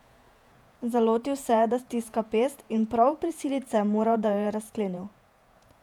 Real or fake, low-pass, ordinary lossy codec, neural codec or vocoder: fake; 19.8 kHz; none; vocoder, 48 kHz, 128 mel bands, Vocos